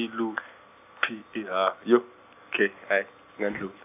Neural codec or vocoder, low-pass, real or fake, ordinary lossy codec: none; 3.6 kHz; real; none